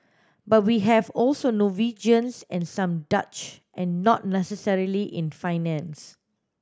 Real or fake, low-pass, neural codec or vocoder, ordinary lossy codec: real; none; none; none